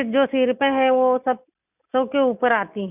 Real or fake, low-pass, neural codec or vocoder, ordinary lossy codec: real; 3.6 kHz; none; none